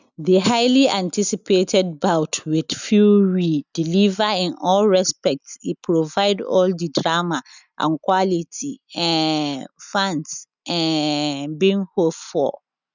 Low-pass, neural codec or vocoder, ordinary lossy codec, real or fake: 7.2 kHz; none; none; real